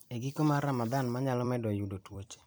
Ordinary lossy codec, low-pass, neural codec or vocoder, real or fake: none; none; vocoder, 44.1 kHz, 128 mel bands every 256 samples, BigVGAN v2; fake